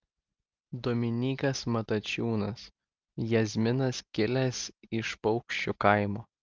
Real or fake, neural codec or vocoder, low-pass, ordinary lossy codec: real; none; 7.2 kHz; Opus, 24 kbps